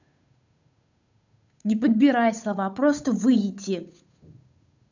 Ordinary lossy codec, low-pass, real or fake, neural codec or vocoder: none; 7.2 kHz; fake; codec, 16 kHz, 8 kbps, FunCodec, trained on Chinese and English, 25 frames a second